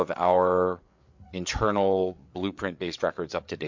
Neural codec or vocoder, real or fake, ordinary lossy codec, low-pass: codec, 16 kHz, 8 kbps, FreqCodec, larger model; fake; MP3, 48 kbps; 7.2 kHz